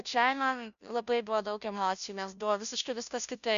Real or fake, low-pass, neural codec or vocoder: fake; 7.2 kHz; codec, 16 kHz, 0.5 kbps, FunCodec, trained on Chinese and English, 25 frames a second